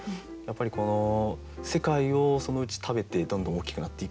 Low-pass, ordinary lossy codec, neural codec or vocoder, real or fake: none; none; none; real